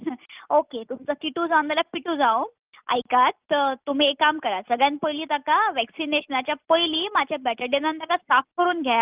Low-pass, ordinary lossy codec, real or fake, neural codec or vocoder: 3.6 kHz; Opus, 24 kbps; real; none